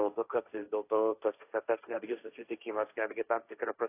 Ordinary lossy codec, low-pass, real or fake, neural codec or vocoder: AAC, 24 kbps; 3.6 kHz; fake; codec, 16 kHz, 1.1 kbps, Voila-Tokenizer